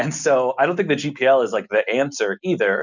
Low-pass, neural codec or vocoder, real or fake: 7.2 kHz; none; real